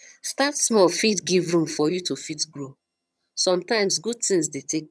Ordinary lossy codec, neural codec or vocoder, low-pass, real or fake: none; vocoder, 22.05 kHz, 80 mel bands, HiFi-GAN; none; fake